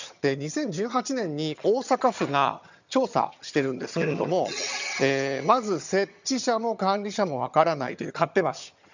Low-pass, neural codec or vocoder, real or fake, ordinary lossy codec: 7.2 kHz; vocoder, 22.05 kHz, 80 mel bands, HiFi-GAN; fake; none